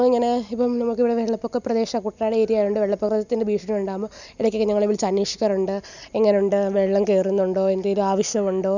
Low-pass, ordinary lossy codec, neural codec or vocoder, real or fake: 7.2 kHz; none; none; real